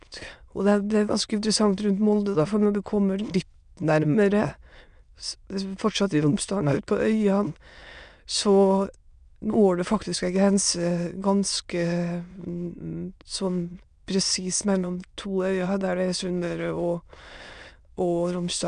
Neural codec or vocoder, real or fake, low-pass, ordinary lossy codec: autoencoder, 22.05 kHz, a latent of 192 numbers a frame, VITS, trained on many speakers; fake; 9.9 kHz; none